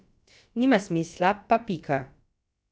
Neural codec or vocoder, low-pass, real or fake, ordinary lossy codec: codec, 16 kHz, about 1 kbps, DyCAST, with the encoder's durations; none; fake; none